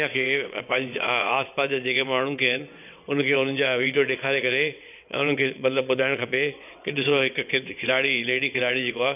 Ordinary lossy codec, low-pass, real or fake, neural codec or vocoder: none; 3.6 kHz; fake; vocoder, 22.05 kHz, 80 mel bands, WaveNeXt